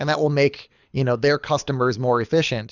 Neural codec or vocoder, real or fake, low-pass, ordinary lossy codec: codec, 24 kHz, 6 kbps, HILCodec; fake; 7.2 kHz; Opus, 64 kbps